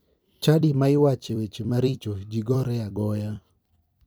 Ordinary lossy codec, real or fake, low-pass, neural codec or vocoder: none; fake; none; vocoder, 44.1 kHz, 128 mel bands every 256 samples, BigVGAN v2